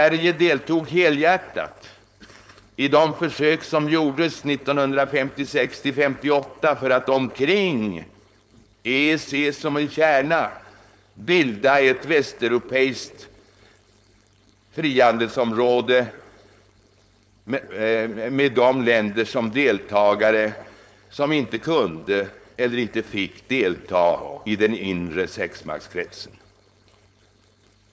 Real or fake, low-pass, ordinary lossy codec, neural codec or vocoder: fake; none; none; codec, 16 kHz, 4.8 kbps, FACodec